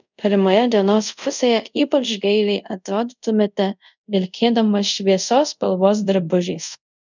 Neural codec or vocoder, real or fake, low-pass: codec, 24 kHz, 0.5 kbps, DualCodec; fake; 7.2 kHz